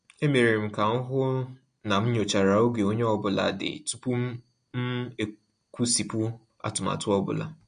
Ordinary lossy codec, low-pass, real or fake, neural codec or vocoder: MP3, 48 kbps; 9.9 kHz; real; none